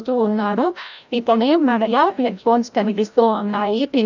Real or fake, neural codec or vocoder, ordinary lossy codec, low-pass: fake; codec, 16 kHz, 0.5 kbps, FreqCodec, larger model; none; 7.2 kHz